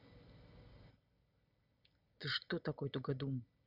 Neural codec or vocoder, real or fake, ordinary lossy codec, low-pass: none; real; none; 5.4 kHz